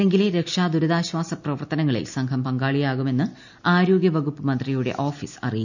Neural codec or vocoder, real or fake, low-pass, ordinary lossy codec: none; real; 7.2 kHz; none